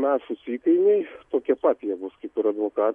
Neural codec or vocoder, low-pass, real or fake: none; 10.8 kHz; real